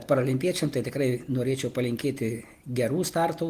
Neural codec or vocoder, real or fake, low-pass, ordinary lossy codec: none; real; 14.4 kHz; Opus, 24 kbps